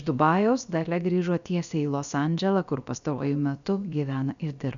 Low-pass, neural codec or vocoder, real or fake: 7.2 kHz; codec, 16 kHz, about 1 kbps, DyCAST, with the encoder's durations; fake